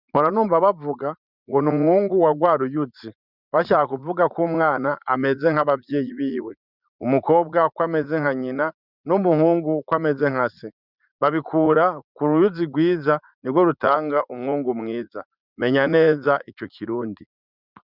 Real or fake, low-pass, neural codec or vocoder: fake; 5.4 kHz; vocoder, 44.1 kHz, 80 mel bands, Vocos